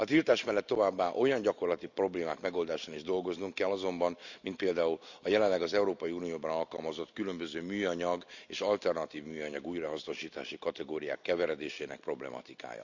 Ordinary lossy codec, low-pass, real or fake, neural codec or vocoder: none; 7.2 kHz; real; none